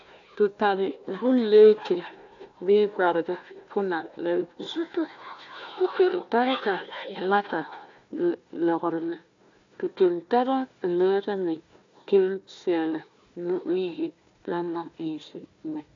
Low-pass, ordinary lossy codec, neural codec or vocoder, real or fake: 7.2 kHz; AAC, 64 kbps; codec, 16 kHz, 1 kbps, FunCodec, trained on Chinese and English, 50 frames a second; fake